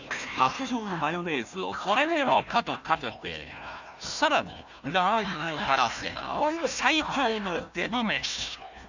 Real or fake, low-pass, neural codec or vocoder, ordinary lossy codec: fake; 7.2 kHz; codec, 16 kHz, 1 kbps, FunCodec, trained on Chinese and English, 50 frames a second; none